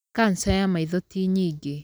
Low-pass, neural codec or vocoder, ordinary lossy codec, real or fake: none; none; none; real